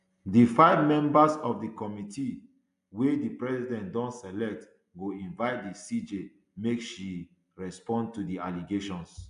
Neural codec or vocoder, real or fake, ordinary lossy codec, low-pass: none; real; AAC, 96 kbps; 10.8 kHz